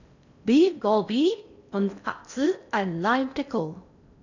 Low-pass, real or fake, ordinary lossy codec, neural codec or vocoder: 7.2 kHz; fake; none; codec, 16 kHz in and 24 kHz out, 0.6 kbps, FocalCodec, streaming, 4096 codes